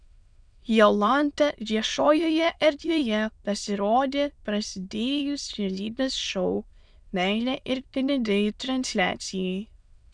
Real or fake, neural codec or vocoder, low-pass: fake; autoencoder, 22.05 kHz, a latent of 192 numbers a frame, VITS, trained on many speakers; 9.9 kHz